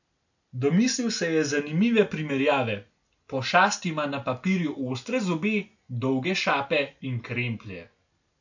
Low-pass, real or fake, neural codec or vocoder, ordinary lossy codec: 7.2 kHz; real; none; none